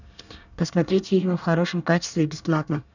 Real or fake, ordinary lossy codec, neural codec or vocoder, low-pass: fake; none; codec, 24 kHz, 1 kbps, SNAC; 7.2 kHz